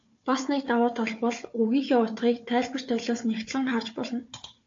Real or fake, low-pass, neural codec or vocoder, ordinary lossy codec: fake; 7.2 kHz; codec, 16 kHz, 4 kbps, FunCodec, trained on Chinese and English, 50 frames a second; AAC, 48 kbps